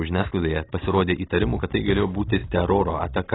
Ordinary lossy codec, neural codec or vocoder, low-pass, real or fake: AAC, 16 kbps; none; 7.2 kHz; real